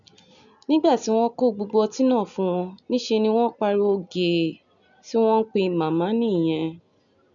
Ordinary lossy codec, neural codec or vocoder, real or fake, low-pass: none; none; real; 7.2 kHz